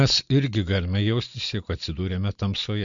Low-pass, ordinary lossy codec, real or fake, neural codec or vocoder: 7.2 kHz; MP3, 64 kbps; real; none